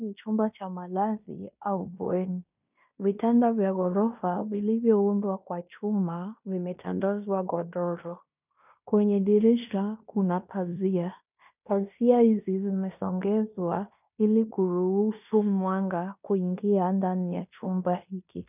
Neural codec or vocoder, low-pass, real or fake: codec, 16 kHz in and 24 kHz out, 0.9 kbps, LongCat-Audio-Codec, fine tuned four codebook decoder; 3.6 kHz; fake